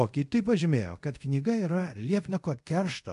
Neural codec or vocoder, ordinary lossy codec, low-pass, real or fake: codec, 24 kHz, 0.5 kbps, DualCodec; MP3, 64 kbps; 10.8 kHz; fake